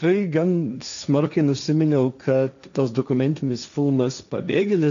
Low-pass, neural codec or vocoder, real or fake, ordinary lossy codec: 7.2 kHz; codec, 16 kHz, 1.1 kbps, Voila-Tokenizer; fake; AAC, 96 kbps